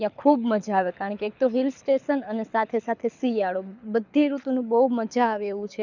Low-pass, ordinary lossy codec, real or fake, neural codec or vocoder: 7.2 kHz; none; fake; codec, 24 kHz, 6 kbps, HILCodec